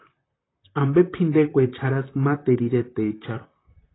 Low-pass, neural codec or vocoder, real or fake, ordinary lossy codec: 7.2 kHz; vocoder, 44.1 kHz, 128 mel bands, Pupu-Vocoder; fake; AAC, 16 kbps